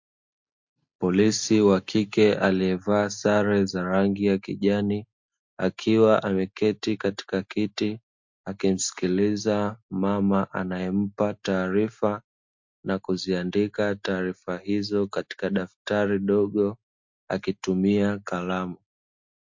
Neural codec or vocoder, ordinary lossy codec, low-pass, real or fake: none; MP3, 48 kbps; 7.2 kHz; real